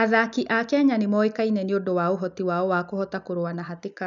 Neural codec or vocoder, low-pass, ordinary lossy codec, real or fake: none; 7.2 kHz; none; real